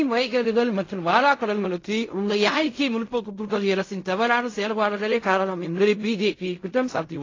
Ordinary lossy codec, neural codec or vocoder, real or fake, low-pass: AAC, 32 kbps; codec, 16 kHz in and 24 kHz out, 0.4 kbps, LongCat-Audio-Codec, fine tuned four codebook decoder; fake; 7.2 kHz